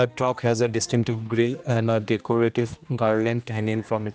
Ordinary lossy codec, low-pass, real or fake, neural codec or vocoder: none; none; fake; codec, 16 kHz, 1 kbps, X-Codec, HuBERT features, trained on general audio